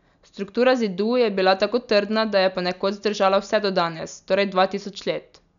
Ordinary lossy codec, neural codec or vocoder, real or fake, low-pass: none; none; real; 7.2 kHz